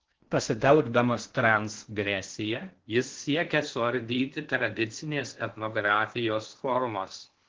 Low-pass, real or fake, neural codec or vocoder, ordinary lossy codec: 7.2 kHz; fake; codec, 16 kHz in and 24 kHz out, 0.6 kbps, FocalCodec, streaming, 4096 codes; Opus, 16 kbps